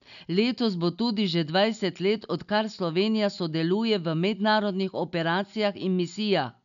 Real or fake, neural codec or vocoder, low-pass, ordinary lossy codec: real; none; 7.2 kHz; none